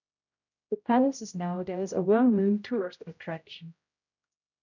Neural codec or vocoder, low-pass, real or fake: codec, 16 kHz, 0.5 kbps, X-Codec, HuBERT features, trained on general audio; 7.2 kHz; fake